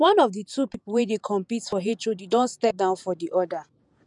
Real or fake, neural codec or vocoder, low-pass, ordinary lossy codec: fake; vocoder, 48 kHz, 128 mel bands, Vocos; 10.8 kHz; none